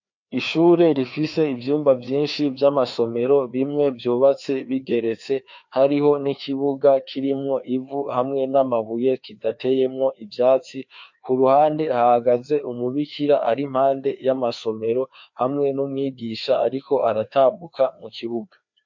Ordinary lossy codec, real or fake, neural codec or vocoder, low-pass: MP3, 48 kbps; fake; codec, 16 kHz, 2 kbps, FreqCodec, larger model; 7.2 kHz